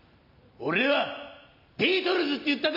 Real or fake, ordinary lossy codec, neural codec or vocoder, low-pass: real; AAC, 32 kbps; none; 5.4 kHz